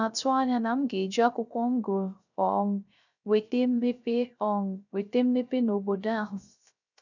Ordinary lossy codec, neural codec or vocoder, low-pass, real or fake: none; codec, 16 kHz, 0.3 kbps, FocalCodec; 7.2 kHz; fake